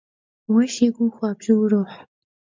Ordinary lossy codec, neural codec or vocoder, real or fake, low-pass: MP3, 64 kbps; none; real; 7.2 kHz